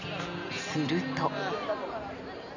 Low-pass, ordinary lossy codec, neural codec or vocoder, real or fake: 7.2 kHz; none; none; real